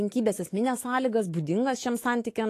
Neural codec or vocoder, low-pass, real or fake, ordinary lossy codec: autoencoder, 48 kHz, 128 numbers a frame, DAC-VAE, trained on Japanese speech; 14.4 kHz; fake; AAC, 48 kbps